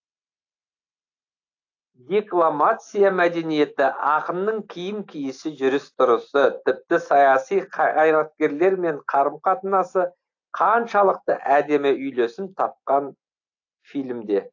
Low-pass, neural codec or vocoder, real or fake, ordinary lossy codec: 7.2 kHz; none; real; AAC, 48 kbps